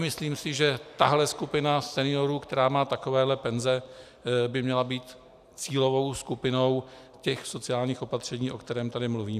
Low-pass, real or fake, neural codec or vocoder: 14.4 kHz; real; none